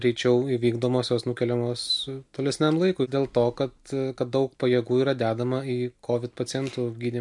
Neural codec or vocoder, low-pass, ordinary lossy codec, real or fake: none; 10.8 kHz; MP3, 64 kbps; real